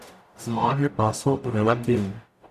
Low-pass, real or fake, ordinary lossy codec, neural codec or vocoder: 14.4 kHz; fake; none; codec, 44.1 kHz, 0.9 kbps, DAC